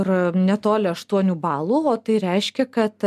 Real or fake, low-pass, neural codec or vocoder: real; 14.4 kHz; none